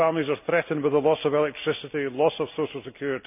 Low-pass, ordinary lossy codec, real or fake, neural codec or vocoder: 3.6 kHz; none; real; none